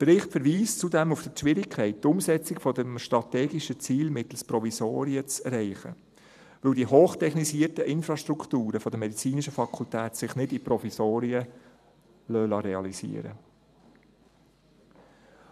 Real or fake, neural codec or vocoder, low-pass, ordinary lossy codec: fake; vocoder, 44.1 kHz, 128 mel bands every 512 samples, BigVGAN v2; 14.4 kHz; none